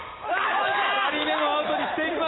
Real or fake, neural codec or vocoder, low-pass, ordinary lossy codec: real; none; 7.2 kHz; AAC, 16 kbps